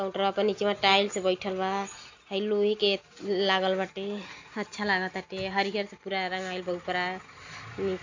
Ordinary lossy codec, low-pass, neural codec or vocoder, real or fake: AAC, 48 kbps; 7.2 kHz; none; real